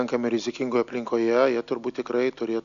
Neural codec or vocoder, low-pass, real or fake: none; 7.2 kHz; real